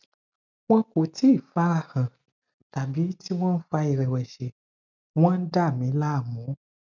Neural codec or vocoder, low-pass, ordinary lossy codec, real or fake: none; 7.2 kHz; none; real